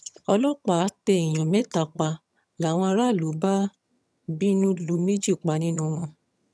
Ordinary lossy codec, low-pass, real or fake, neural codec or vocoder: none; none; fake; vocoder, 22.05 kHz, 80 mel bands, HiFi-GAN